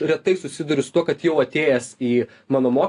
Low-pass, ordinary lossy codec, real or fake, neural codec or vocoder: 10.8 kHz; AAC, 48 kbps; real; none